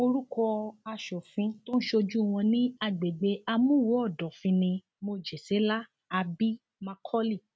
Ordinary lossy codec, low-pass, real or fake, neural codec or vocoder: none; none; real; none